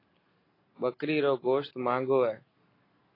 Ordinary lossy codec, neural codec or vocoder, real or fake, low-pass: AAC, 24 kbps; none; real; 5.4 kHz